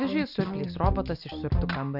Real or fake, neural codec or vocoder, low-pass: real; none; 5.4 kHz